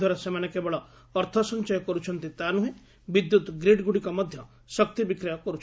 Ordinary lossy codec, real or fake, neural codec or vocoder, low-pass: none; real; none; none